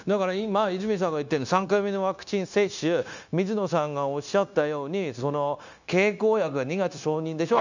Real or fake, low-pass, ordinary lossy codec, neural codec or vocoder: fake; 7.2 kHz; none; codec, 16 kHz, 0.9 kbps, LongCat-Audio-Codec